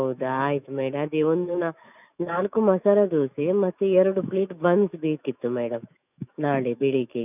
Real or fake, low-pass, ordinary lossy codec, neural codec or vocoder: fake; 3.6 kHz; none; autoencoder, 48 kHz, 128 numbers a frame, DAC-VAE, trained on Japanese speech